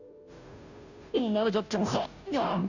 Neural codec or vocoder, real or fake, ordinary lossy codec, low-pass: codec, 16 kHz, 0.5 kbps, FunCodec, trained on Chinese and English, 25 frames a second; fake; none; 7.2 kHz